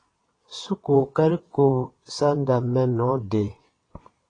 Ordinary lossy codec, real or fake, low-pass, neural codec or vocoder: AAC, 32 kbps; fake; 9.9 kHz; vocoder, 22.05 kHz, 80 mel bands, WaveNeXt